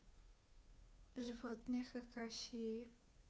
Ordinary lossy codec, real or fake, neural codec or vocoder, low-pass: none; fake; codec, 16 kHz, 2 kbps, FunCodec, trained on Chinese and English, 25 frames a second; none